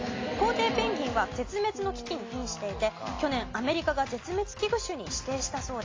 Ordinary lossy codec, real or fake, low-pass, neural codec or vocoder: AAC, 32 kbps; real; 7.2 kHz; none